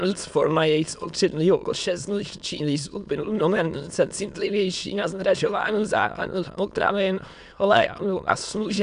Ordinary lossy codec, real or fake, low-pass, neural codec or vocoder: AAC, 96 kbps; fake; 9.9 kHz; autoencoder, 22.05 kHz, a latent of 192 numbers a frame, VITS, trained on many speakers